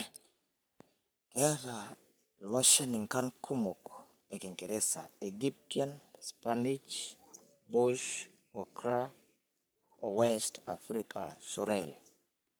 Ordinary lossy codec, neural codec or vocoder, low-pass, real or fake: none; codec, 44.1 kHz, 3.4 kbps, Pupu-Codec; none; fake